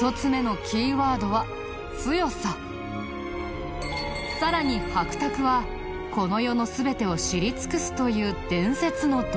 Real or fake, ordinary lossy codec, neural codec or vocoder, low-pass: real; none; none; none